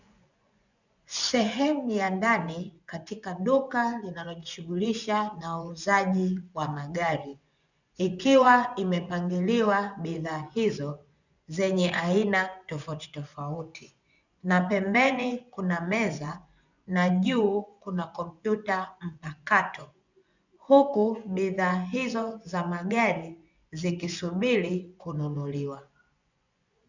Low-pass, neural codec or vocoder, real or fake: 7.2 kHz; vocoder, 24 kHz, 100 mel bands, Vocos; fake